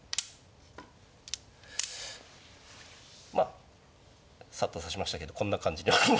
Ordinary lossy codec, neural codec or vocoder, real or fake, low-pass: none; none; real; none